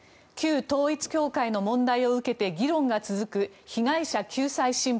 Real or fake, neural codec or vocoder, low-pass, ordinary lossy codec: real; none; none; none